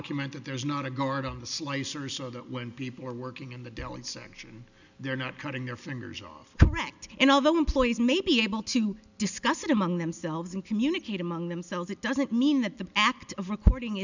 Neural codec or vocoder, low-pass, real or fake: none; 7.2 kHz; real